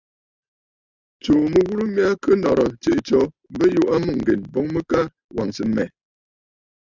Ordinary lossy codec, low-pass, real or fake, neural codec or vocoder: Opus, 64 kbps; 7.2 kHz; real; none